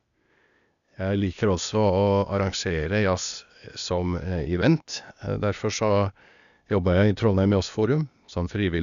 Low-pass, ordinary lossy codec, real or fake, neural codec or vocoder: 7.2 kHz; none; fake; codec, 16 kHz, 0.8 kbps, ZipCodec